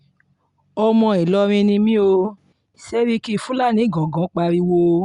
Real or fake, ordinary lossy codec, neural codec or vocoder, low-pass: real; Opus, 64 kbps; none; 10.8 kHz